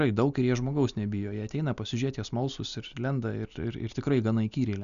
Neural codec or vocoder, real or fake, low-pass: none; real; 7.2 kHz